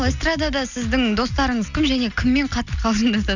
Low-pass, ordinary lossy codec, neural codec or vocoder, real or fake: 7.2 kHz; none; none; real